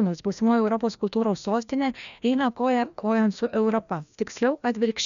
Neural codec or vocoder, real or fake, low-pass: codec, 16 kHz, 1 kbps, FreqCodec, larger model; fake; 7.2 kHz